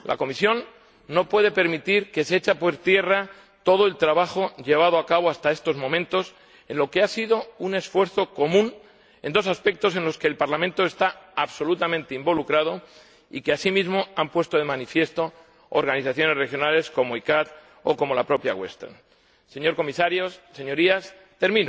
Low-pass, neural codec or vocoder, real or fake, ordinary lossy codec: none; none; real; none